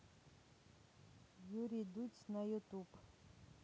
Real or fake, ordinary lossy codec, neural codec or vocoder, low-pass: real; none; none; none